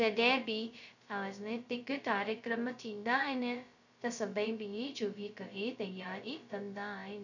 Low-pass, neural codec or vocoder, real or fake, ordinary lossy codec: 7.2 kHz; codec, 16 kHz, 0.2 kbps, FocalCodec; fake; none